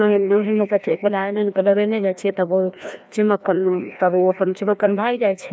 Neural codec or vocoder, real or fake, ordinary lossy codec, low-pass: codec, 16 kHz, 1 kbps, FreqCodec, larger model; fake; none; none